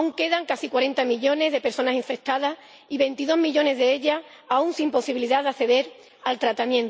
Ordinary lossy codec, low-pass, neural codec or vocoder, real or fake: none; none; none; real